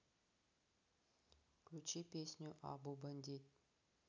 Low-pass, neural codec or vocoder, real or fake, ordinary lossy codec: 7.2 kHz; none; real; none